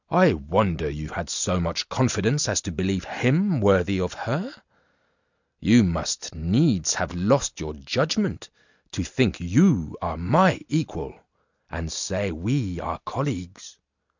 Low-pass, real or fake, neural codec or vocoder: 7.2 kHz; real; none